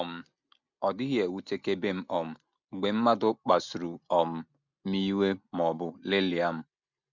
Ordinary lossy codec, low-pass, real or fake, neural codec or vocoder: none; 7.2 kHz; real; none